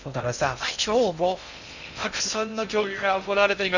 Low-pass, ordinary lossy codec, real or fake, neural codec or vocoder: 7.2 kHz; none; fake; codec, 16 kHz in and 24 kHz out, 0.6 kbps, FocalCodec, streaming, 2048 codes